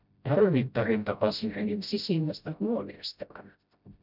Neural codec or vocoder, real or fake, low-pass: codec, 16 kHz, 0.5 kbps, FreqCodec, smaller model; fake; 5.4 kHz